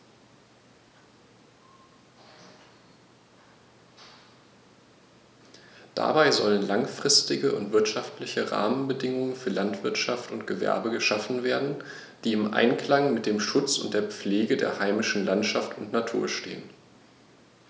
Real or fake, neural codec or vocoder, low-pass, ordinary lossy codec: real; none; none; none